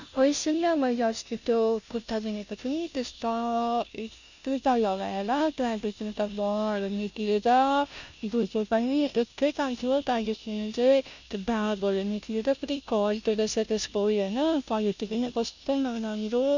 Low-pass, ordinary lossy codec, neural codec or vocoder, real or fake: 7.2 kHz; none; codec, 16 kHz, 0.5 kbps, FunCodec, trained on Chinese and English, 25 frames a second; fake